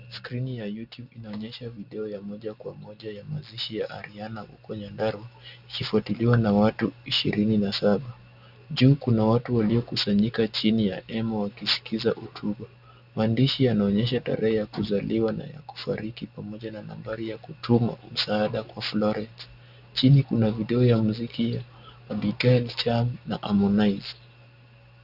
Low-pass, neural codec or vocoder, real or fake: 5.4 kHz; none; real